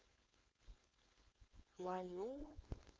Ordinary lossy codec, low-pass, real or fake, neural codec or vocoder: none; none; fake; codec, 16 kHz, 4.8 kbps, FACodec